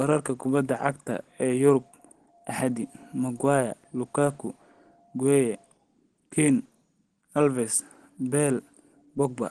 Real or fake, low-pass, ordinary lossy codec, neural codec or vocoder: real; 9.9 kHz; Opus, 16 kbps; none